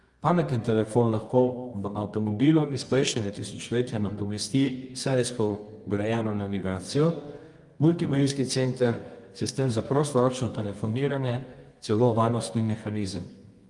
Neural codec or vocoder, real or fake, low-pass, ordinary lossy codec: codec, 24 kHz, 0.9 kbps, WavTokenizer, medium music audio release; fake; 10.8 kHz; Opus, 32 kbps